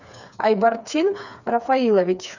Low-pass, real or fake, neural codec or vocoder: 7.2 kHz; fake; codec, 16 kHz, 4 kbps, FreqCodec, smaller model